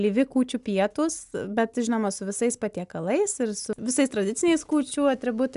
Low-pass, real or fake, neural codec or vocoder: 10.8 kHz; real; none